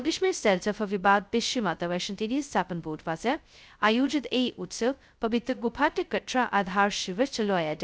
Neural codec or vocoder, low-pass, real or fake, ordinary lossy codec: codec, 16 kHz, 0.2 kbps, FocalCodec; none; fake; none